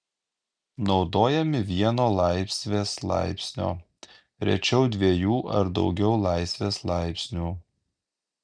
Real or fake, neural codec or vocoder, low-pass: real; none; 9.9 kHz